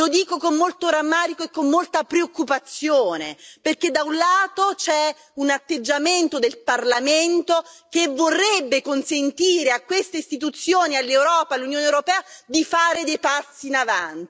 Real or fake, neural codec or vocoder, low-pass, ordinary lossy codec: real; none; none; none